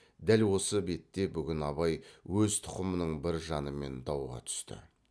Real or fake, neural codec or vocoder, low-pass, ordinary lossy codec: real; none; none; none